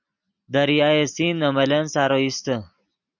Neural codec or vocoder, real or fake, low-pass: none; real; 7.2 kHz